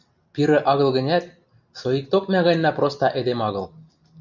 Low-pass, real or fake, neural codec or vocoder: 7.2 kHz; real; none